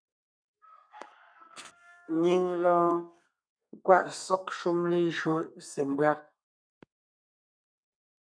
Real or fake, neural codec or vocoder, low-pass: fake; codec, 32 kHz, 1.9 kbps, SNAC; 9.9 kHz